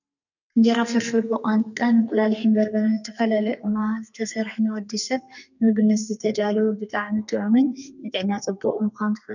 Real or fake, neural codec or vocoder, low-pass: fake; codec, 32 kHz, 1.9 kbps, SNAC; 7.2 kHz